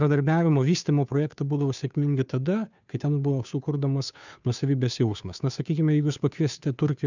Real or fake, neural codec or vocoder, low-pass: fake; codec, 16 kHz, 2 kbps, FunCodec, trained on Chinese and English, 25 frames a second; 7.2 kHz